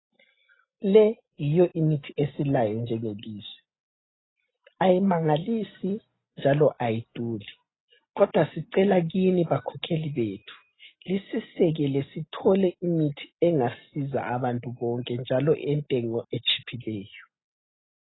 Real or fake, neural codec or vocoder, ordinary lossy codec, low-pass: real; none; AAC, 16 kbps; 7.2 kHz